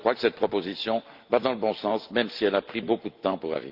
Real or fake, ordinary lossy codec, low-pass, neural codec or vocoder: real; Opus, 32 kbps; 5.4 kHz; none